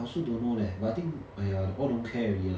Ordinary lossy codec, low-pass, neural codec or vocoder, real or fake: none; none; none; real